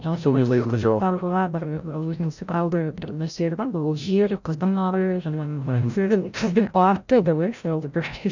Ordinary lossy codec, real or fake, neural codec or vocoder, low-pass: none; fake; codec, 16 kHz, 0.5 kbps, FreqCodec, larger model; 7.2 kHz